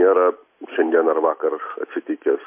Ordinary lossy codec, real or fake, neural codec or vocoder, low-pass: MP3, 24 kbps; real; none; 3.6 kHz